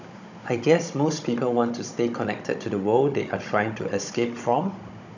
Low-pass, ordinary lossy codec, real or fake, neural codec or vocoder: 7.2 kHz; none; fake; codec, 16 kHz, 16 kbps, FunCodec, trained on Chinese and English, 50 frames a second